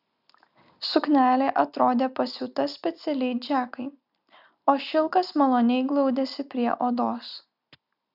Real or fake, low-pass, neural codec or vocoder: real; 5.4 kHz; none